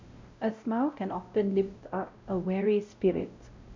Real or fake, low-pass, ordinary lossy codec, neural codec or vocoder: fake; 7.2 kHz; MP3, 64 kbps; codec, 16 kHz, 0.5 kbps, X-Codec, WavLM features, trained on Multilingual LibriSpeech